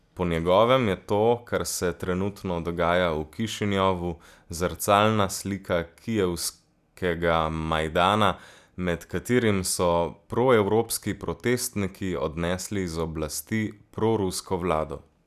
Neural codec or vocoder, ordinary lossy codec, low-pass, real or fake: none; none; 14.4 kHz; real